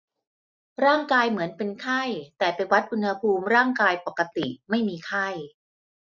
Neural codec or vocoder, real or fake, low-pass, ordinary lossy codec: none; real; 7.2 kHz; none